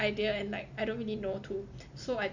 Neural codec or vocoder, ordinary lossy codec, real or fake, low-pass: none; none; real; 7.2 kHz